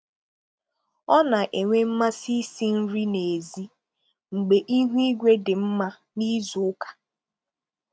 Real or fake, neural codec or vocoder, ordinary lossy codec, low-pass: real; none; none; none